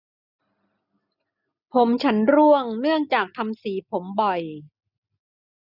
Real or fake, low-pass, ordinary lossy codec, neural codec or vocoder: real; 5.4 kHz; none; none